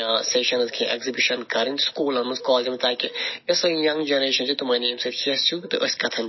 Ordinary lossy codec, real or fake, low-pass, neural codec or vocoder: MP3, 24 kbps; real; 7.2 kHz; none